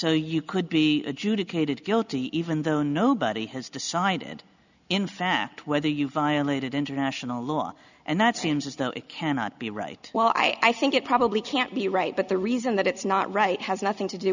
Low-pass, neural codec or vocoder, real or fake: 7.2 kHz; none; real